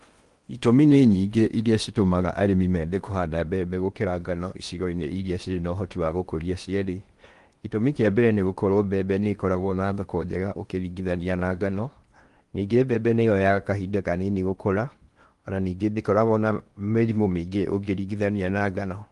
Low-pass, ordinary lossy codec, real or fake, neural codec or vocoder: 10.8 kHz; Opus, 24 kbps; fake; codec, 16 kHz in and 24 kHz out, 0.8 kbps, FocalCodec, streaming, 65536 codes